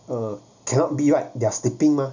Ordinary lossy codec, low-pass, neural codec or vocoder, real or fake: none; 7.2 kHz; none; real